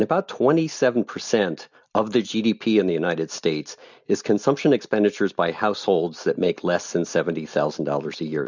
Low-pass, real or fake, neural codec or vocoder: 7.2 kHz; real; none